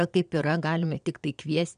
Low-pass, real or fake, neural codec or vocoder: 9.9 kHz; fake; vocoder, 22.05 kHz, 80 mel bands, Vocos